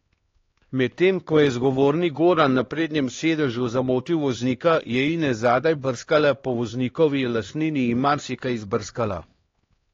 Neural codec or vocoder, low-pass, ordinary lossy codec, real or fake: codec, 16 kHz, 2 kbps, X-Codec, HuBERT features, trained on LibriSpeech; 7.2 kHz; AAC, 32 kbps; fake